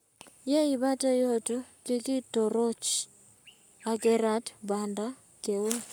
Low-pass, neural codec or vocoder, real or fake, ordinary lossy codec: none; codec, 44.1 kHz, 7.8 kbps, Pupu-Codec; fake; none